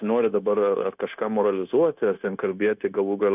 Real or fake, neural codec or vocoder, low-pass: fake; codec, 16 kHz, 0.9 kbps, LongCat-Audio-Codec; 3.6 kHz